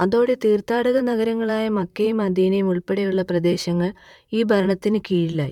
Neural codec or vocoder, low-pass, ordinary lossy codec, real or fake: vocoder, 44.1 kHz, 128 mel bands, Pupu-Vocoder; 19.8 kHz; none; fake